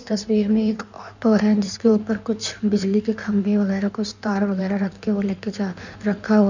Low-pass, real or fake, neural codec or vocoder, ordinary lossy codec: 7.2 kHz; fake; codec, 16 kHz in and 24 kHz out, 1.1 kbps, FireRedTTS-2 codec; none